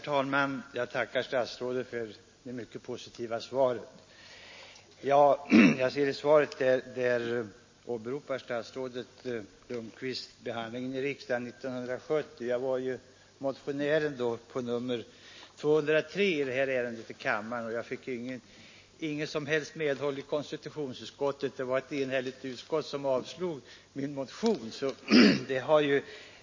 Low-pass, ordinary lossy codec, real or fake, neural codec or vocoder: 7.2 kHz; MP3, 32 kbps; real; none